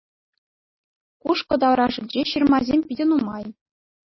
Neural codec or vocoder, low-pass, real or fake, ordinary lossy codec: none; 7.2 kHz; real; MP3, 24 kbps